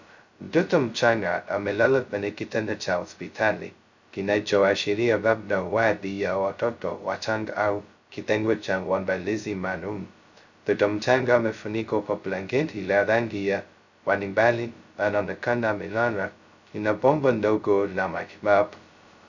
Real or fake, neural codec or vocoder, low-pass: fake; codec, 16 kHz, 0.2 kbps, FocalCodec; 7.2 kHz